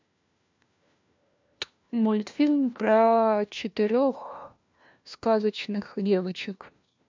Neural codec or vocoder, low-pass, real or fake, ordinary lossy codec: codec, 16 kHz, 1 kbps, FunCodec, trained on LibriTTS, 50 frames a second; 7.2 kHz; fake; MP3, 64 kbps